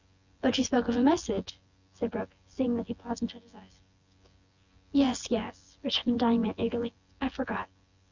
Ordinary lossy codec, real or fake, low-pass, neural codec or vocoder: Opus, 64 kbps; fake; 7.2 kHz; vocoder, 24 kHz, 100 mel bands, Vocos